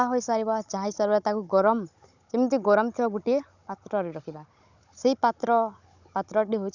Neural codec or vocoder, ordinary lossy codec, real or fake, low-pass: codec, 16 kHz, 16 kbps, FunCodec, trained on Chinese and English, 50 frames a second; none; fake; 7.2 kHz